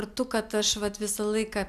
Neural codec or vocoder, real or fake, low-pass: none; real; 14.4 kHz